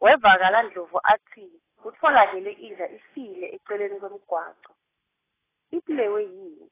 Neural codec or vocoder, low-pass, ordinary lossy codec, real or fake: none; 3.6 kHz; AAC, 16 kbps; real